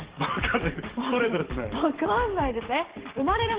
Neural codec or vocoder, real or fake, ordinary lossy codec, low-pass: codec, 44.1 kHz, 7.8 kbps, DAC; fake; Opus, 16 kbps; 3.6 kHz